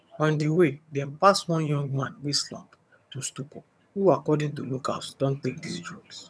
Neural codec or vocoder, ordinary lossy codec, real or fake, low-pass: vocoder, 22.05 kHz, 80 mel bands, HiFi-GAN; none; fake; none